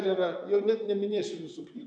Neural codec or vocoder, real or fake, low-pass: vocoder, 44.1 kHz, 128 mel bands, Pupu-Vocoder; fake; 9.9 kHz